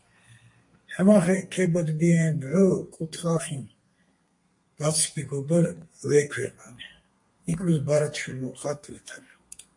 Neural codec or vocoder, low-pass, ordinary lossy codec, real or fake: codec, 32 kHz, 1.9 kbps, SNAC; 10.8 kHz; MP3, 48 kbps; fake